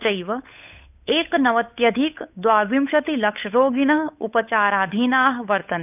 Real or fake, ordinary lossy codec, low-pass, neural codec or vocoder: fake; none; 3.6 kHz; codec, 16 kHz, 8 kbps, FunCodec, trained on Chinese and English, 25 frames a second